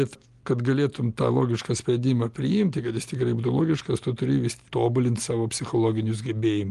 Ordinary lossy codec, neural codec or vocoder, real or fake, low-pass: Opus, 24 kbps; none; real; 10.8 kHz